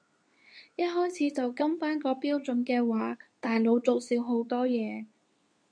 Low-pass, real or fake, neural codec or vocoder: 9.9 kHz; fake; codec, 24 kHz, 0.9 kbps, WavTokenizer, medium speech release version 2